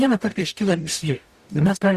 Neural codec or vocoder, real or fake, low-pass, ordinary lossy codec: codec, 44.1 kHz, 0.9 kbps, DAC; fake; 14.4 kHz; Opus, 64 kbps